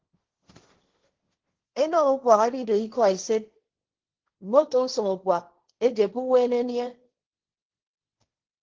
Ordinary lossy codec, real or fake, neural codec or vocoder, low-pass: Opus, 16 kbps; fake; codec, 16 kHz, 1.1 kbps, Voila-Tokenizer; 7.2 kHz